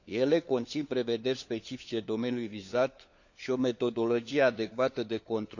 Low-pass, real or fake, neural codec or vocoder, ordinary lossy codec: 7.2 kHz; fake; codec, 16 kHz, 4 kbps, FunCodec, trained on LibriTTS, 50 frames a second; none